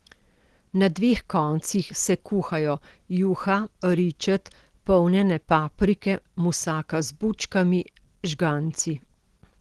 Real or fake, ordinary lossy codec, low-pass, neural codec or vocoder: real; Opus, 16 kbps; 10.8 kHz; none